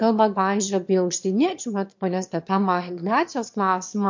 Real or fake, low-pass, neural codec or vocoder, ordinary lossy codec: fake; 7.2 kHz; autoencoder, 22.05 kHz, a latent of 192 numbers a frame, VITS, trained on one speaker; MP3, 48 kbps